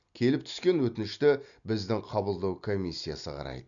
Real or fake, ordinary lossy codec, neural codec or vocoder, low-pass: real; none; none; 7.2 kHz